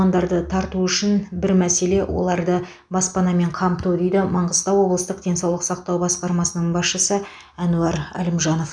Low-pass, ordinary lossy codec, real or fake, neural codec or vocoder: 9.9 kHz; none; real; none